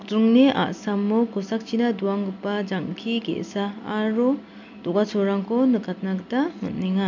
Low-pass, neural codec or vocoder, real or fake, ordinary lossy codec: 7.2 kHz; none; real; none